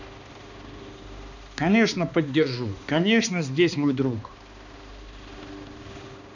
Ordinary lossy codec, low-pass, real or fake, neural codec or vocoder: none; 7.2 kHz; fake; codec, 16 kHz, 2 kbps, X-Codec, HuBERT features, trained on balanced general audio